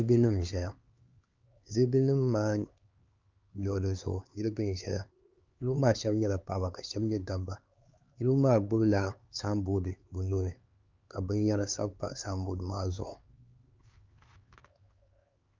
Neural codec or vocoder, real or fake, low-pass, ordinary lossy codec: codec, 16 kHz, 4 kbps, X-Codec, HuBERT features, trained on LibriSpeech; fake; 7.2 kHz; Opus, 24 kbps